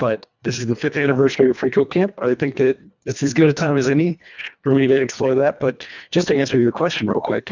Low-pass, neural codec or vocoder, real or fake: 7.2 kHz; codec, 24 kHz, 1.5 kbps, HILCodec; fake